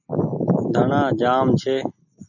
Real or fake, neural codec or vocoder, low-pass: real; none; 7.2 kHz